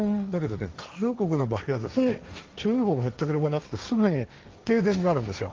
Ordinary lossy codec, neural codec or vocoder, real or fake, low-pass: Opus, 32 kbps; codec, 16 kHz, 1.1 kbps, Voila-Tokenizer; fake; 7.2 kHz